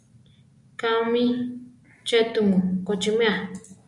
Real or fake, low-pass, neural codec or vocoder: real; 10.8 kHz; none